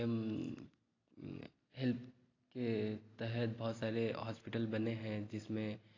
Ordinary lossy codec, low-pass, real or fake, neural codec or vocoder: AAC, 32 kbps; 7.2 kHz; real; none